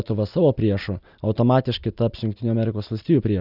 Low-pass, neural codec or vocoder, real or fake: 5.4 kHz; none; real